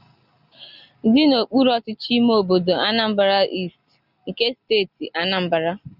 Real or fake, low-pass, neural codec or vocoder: real; 5.4 kHz; none